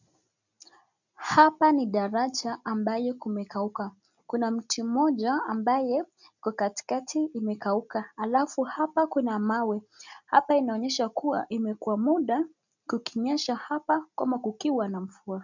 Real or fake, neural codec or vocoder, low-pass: real; none; 7.2 kHz